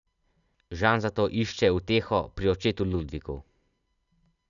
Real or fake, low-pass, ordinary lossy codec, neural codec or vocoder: real; 7.2 kHz; none; none